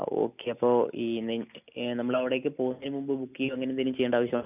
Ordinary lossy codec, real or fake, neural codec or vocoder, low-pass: none; real; none; 3.6 kHz